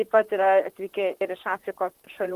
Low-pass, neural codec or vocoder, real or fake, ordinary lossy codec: 14.4 kHz; vocoder, 44.1 kHz, 128 mel bands, Pupu-Vocoder; fake; Opus, 24 kbps